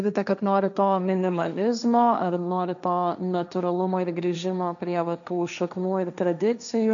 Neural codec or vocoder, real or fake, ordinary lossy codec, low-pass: codec, 16 kHz, 1.1 kbps, Voila-Tokenizer; fake; MP3, 96 kbps; 7.2 kHz